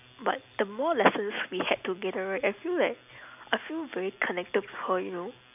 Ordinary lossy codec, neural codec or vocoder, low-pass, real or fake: none; none; 3.6 kHz; real